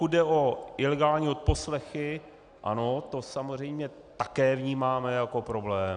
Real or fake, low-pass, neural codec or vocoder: real; 9.9 kHz; none